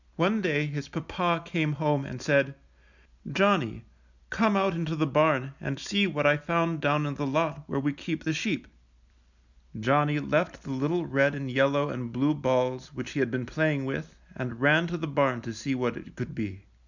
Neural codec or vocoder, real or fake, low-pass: none; real; 7.2 kHz